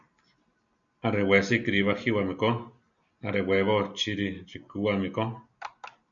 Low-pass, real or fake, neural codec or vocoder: 7.2 kHz; real; none